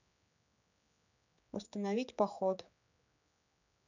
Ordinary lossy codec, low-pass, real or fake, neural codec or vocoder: none; 7.2 kHz; fake; codec, 16 kHz, 4 kbps, X-Codec, HuBERT features, trained on general audio